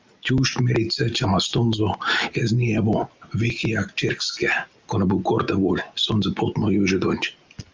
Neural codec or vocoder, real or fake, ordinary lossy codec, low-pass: none; real; Opus, 24 kbps; 7.2 kHz